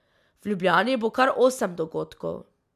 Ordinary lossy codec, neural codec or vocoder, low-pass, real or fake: MP3, 96 kbps; none; 14.4 kHz; real